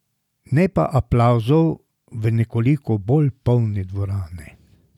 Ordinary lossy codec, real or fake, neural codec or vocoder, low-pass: none; real; none; 19.8 kHz